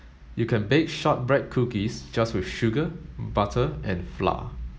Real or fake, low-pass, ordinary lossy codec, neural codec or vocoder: real; none; none; none